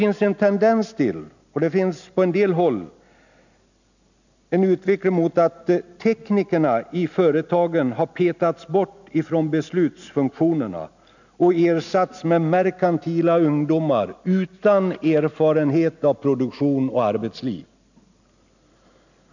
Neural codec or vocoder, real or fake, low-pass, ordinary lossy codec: none; real; 7.2 kHz; none